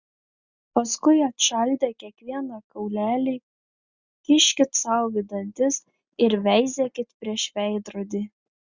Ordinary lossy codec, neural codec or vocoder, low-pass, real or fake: Opus, 64 kbps; none; 7.2 kHz; real